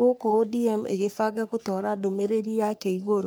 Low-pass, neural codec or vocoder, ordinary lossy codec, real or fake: none; codec, 44.1 kHz, 3.4 kbps, Pupu-Codec; none; fake